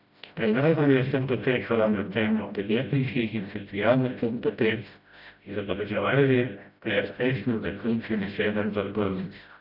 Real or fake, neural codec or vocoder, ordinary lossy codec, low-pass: fake; codec, 16 kHz, 0.5 kbps, FreqCodec, smaller model; none; 5.4 kHz